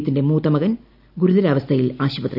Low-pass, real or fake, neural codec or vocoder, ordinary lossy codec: 5.4 kHz; real; none; none